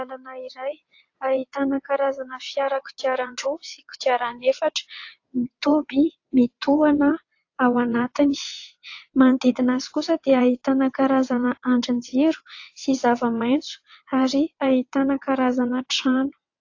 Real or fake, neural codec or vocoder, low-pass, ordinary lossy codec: fake; vocoder, 22.05 kHz, 80 mel bands, WaveNeXt; 7.2 kHz; AAC, 48 kbps